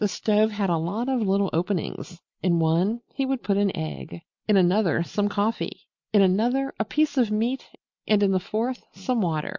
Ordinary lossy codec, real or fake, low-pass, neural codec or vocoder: MP3, 48 kbps; real; 7.2 kHz; none